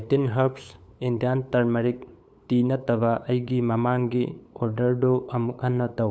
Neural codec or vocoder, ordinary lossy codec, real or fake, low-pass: codec, 16 kHz, 8 kbps, FunCodec, trained on LibriTTS, 25 frames a second; none; fake; none